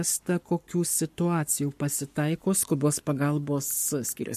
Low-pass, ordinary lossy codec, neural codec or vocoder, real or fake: 14.4 kHz; MP3, 64 kbps; codec, 44.1 kHz, 7.8 kbps, Pupu-Codec; fake